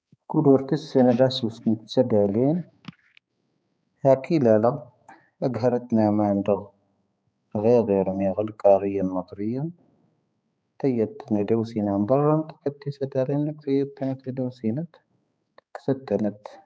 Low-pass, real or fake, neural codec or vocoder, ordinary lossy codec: none; fake; codec, 16 kHz, 4 kbps, X-Codec, HuBERT features, trained on balanced general audio; none